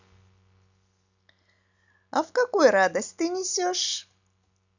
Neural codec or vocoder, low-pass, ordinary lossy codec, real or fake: none; 7.2 kHz; none; real